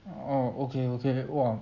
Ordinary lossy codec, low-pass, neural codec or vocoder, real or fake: none; 7.2 kHz; none; real